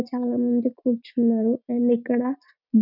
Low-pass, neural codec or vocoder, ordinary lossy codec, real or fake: 5.4 kHz; none; none; real